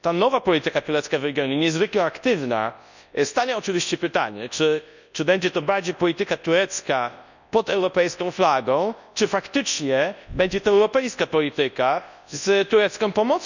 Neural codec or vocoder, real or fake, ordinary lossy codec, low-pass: codec, 24 kHz, 0.9 kbps, WavTokenizer, large speech release; fake; none; 7.2 kHz